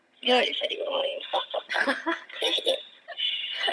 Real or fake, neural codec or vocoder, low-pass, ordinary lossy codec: fake; vocoder, 22.05 kHz, 80 mel bands, HiFi-GAN; none; none